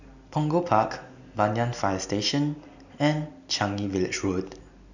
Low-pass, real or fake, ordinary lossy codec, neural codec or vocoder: 7.2 kHz; real; none; none